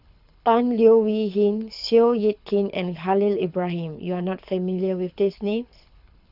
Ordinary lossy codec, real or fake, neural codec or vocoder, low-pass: none; fake; codec, 24 kHz, 6 kbps, HILCodec; 5.4 kHz